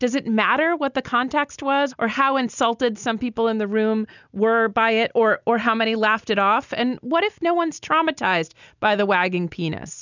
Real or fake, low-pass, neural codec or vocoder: real; 7.2 kHz; none